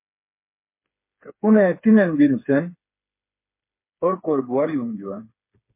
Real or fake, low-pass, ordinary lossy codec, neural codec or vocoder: fake; 3.6 kHz; MP3, 24 kbps; codec, 16 kHz, 4 kbps, FreqCodec, smaller model